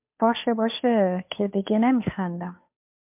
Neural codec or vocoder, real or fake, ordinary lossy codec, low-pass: codec, 16 kHz, 2 kbps, FunCodec, trained on Chinese and English, 25 frames a second; fake; MP3, 32 kbps; 3.6 kHz